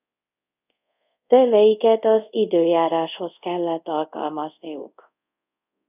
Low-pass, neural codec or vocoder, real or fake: 3.6 kHz; codec, 24 kHz, 0.5 kbps, DualCodec; fake